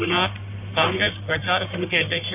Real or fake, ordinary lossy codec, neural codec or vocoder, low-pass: fake; none; codec, 44.1 kHz, 1.7 kbps, Pupu-Codec; 3.6 kHz